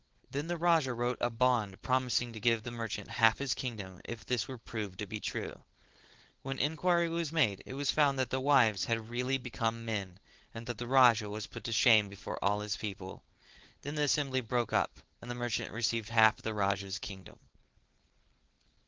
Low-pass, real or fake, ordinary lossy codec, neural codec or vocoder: 7.2 kHz; fake; Opus, 16 kbps; codec, 16 kHz, 4.8 kbps, FACodec